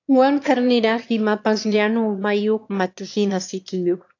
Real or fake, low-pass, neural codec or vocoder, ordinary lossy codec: fake; 7.2 kHz; autoencoder, 22.05 kHz, a latent of 192 numbers a frame, VITS, trained on one speaker; AAC, 48 kbps